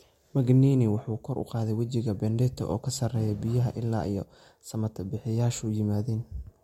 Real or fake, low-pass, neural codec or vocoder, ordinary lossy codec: real; 19.8 kHz; none; MP3, 64 kbps